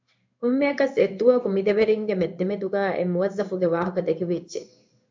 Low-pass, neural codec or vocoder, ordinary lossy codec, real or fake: 7.2 kHz; codec, 16 kHz in and 24 kHz out, 1 kbps, XY-Tokenizer; MP3, 64 kbps; fake